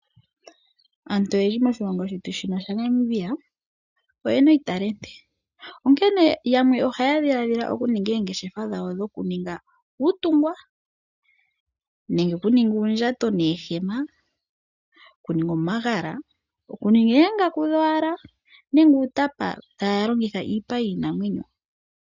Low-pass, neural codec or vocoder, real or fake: 7.2 kHz; none; real